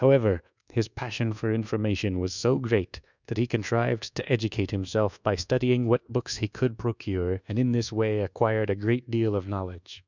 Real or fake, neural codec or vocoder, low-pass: fake; codec, 24 kHz, 1.2 kbps, DualCodec; 7.2 kHz